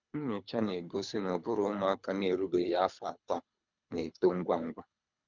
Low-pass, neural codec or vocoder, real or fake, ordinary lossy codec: 7.2 kHz; codec, 24 kHz, 3 kbps, HILCodec; fake; none